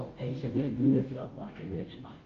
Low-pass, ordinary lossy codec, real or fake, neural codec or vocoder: 7.2 kHz; Opus, 24 kbps; fake; codec, 16 kHz, 0.5 kbps, FunCodec, trained on Chinese and English, 25 frames a second